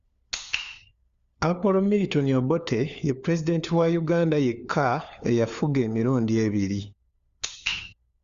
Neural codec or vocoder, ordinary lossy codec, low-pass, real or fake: codec, 16 kHz, 4 kbps, FunCodec, trained on LibriTTS, 50 frames a second; Opus, 64 kbps; 7.2 kHz; fake